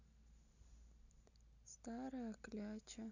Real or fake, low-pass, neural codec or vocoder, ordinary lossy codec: real; 7.2 kHz; none; none